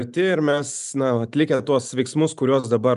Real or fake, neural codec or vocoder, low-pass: real; none; 10.8 kHz